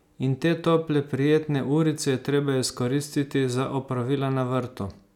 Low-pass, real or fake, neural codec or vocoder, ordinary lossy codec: 19.8 kHz; real; none; none